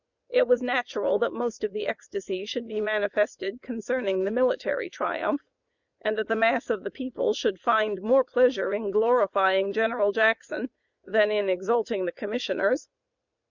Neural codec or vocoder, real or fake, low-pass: vocoder, 44.1 kHz, 80 mel bands, Vocos; fake; 7.2 kHz